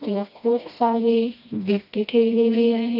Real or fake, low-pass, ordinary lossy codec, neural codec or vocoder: fake; 5.4 kHz; AAC, 48 kbps; codec, 16 kHz, 1 kbps, FreqCodec, smaller model